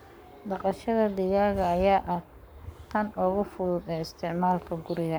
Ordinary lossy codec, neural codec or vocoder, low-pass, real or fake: none; codec, 44.1 kHz, 7.8 kbps, Pupu-Codec; none; fake